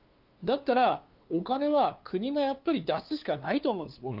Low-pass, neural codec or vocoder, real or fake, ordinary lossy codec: 5.4 kHz; codec, 16 kHz, 2 kbps, FunCodec, trained on LibriTTS, 25 frames a second; fake; Opus, 32 kbps